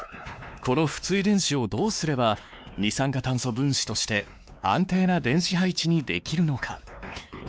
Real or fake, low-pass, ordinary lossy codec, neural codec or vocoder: fake; none; none; codec, 16 kHz, 2 kbps, X-Codec, WavLM features, trained on Multilingual LibriSpeech